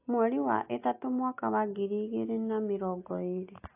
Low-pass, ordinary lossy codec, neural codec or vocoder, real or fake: 3.6 kHz; AAC, 24 kbps; none; real